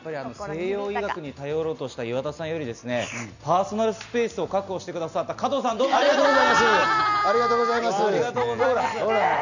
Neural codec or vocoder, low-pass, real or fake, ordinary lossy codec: none; 7.2 kHz; real; none